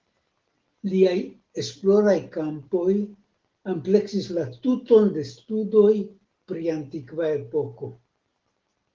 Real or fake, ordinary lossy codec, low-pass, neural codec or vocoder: real; Opus, 16 kbps; 7.2 kHz; none